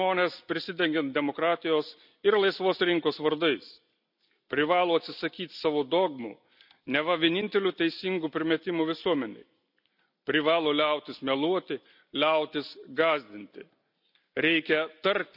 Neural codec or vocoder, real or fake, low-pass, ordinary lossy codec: none; real; 5.4 kHz; none